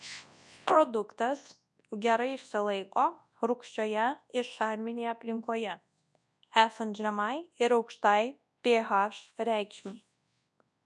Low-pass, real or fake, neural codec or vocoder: 10.8 kHz; fake; codec, 24 kHz, 0.9 kbps, WavTokenizer, large speech release